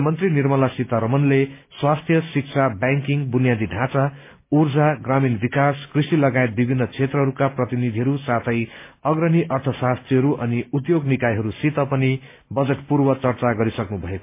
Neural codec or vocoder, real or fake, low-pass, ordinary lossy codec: none; real; 3.6 kHz; MP3, 24 kbps